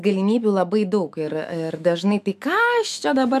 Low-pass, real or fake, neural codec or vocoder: 14.4 kHz; fake; autoencoder, 48 kHz, 128 numbers a frame, DAC-VAE, trained on Japanese speech